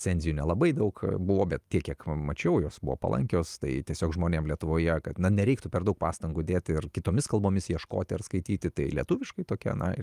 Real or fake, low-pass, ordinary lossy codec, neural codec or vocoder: real; 14.4 kHz; Opus, 32 kbps; none